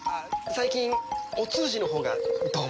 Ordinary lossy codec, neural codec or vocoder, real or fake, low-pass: none; none; real; none